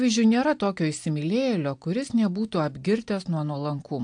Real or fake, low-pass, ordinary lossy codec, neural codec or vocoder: real; 9.9 kHz; AAC, 64 kbps; none